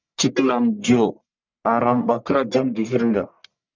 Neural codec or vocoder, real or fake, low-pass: codec, 44.1 kHz, 1.7 kbps, Pupu-Codec; fake; 7.2 kHz